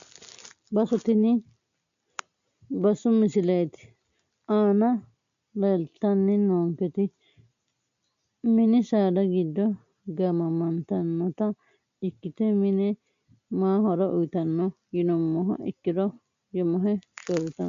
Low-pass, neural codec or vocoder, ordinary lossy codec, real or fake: 7.2 kHz; none; AAC, 96 kbps; real